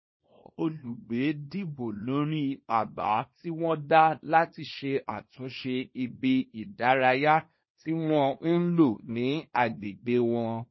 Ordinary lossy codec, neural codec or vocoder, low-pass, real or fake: MP3, 24 kbps; codec, 24 kHz, 0.9 kbps, WavTokenizer, small release; 7.2 kHz; fake